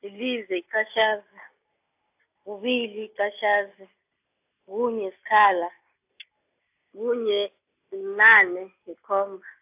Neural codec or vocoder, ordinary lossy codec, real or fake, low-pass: none; AAC, 32 kbps; real; 3.6 kHz